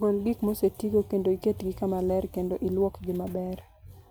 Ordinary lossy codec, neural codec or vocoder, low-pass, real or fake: none; none; none; real